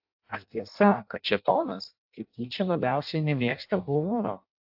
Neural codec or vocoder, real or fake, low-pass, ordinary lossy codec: codec, 16 kHz in and 24 kHz out, 0.6 kbps, FireRedTTS-2 codec; fake; 5.4 kHz; AAC, 48 kbps